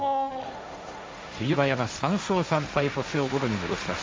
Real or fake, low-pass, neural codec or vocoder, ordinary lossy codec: fake; none; codec, 16 kHz, 1.1 kbps, Voila-Tokenizer; none